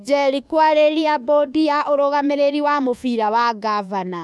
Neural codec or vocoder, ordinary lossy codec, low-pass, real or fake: codec, 24 kHz, 1.2 kbps, DualCodec; none; none; fake